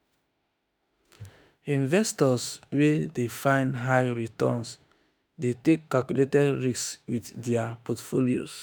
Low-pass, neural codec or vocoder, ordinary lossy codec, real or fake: none; autoencoder, 48 kHz, 32 numbers a frame, DAC-VAE, trained on Japanese speech; none; fake